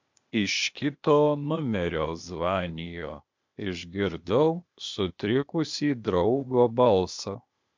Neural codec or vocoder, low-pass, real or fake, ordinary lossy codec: codec, 16 kHz, 0.8 kbps, ZipCodec; 7.2 kHz; fake; MP3, 64 kbps